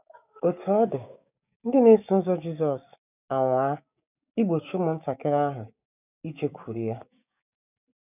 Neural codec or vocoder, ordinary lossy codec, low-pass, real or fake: codec, 16 kHz, 6 kbps, DAC; none; 3.6 kHz; fake